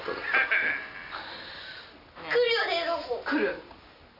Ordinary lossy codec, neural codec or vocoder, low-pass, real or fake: none; none; 5.4 kHz; real